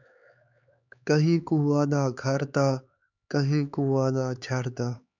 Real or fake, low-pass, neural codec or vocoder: fake; 7.2 kHz; codec, 16 kHz, 4 kbps, X-Codec, HuBERT features, trained on LibriSpeech